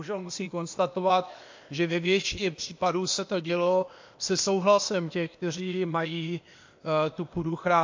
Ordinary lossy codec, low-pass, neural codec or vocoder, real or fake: MP3, 48 kbps; 7.2 kHz; codec, 16 kHz, 0.8 kbps, ZipCodec; fake